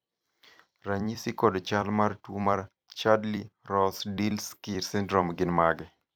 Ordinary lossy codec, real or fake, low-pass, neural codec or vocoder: none; fake; none; vocoder, 44.1 kHz, 128 mel bands every 256 samples, BigVGAN v2